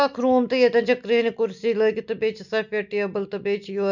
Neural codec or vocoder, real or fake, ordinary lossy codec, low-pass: none; real; none; 7.2 kHz